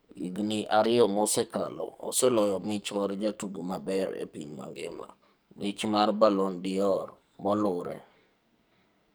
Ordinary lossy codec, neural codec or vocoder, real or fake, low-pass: none; codec, 44.1 kHz, 2.6 kbps, SNAC; fake; none